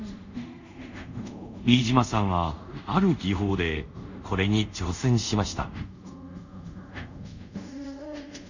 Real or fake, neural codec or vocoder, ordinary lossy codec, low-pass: fake; codec, 24 kHz, 0.5 kbps, DualCodec; none; 7.2 kHz